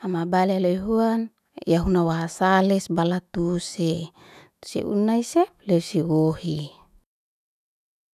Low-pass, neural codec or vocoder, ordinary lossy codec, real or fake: 14.4 kHz; autoencoder, 48 kHz, 128 numbers a frame, DAC-VAE, trained on Japanese speech; none; fake